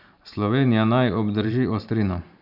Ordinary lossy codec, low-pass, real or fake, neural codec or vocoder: none; 5.4 kHz; fake; vocoder, 44.1 kHz, 128 mel bands every 512 samples, BigVGAN v2